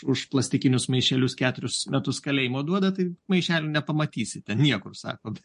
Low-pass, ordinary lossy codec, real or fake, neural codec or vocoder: 10.8 kHz; MP3, 48 kbps; real; none